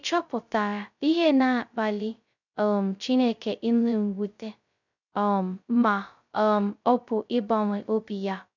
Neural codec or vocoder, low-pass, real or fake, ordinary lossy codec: codec, 16 kHz, 0.2 kbps, FocalCodec; 7.2 kHz; fake; none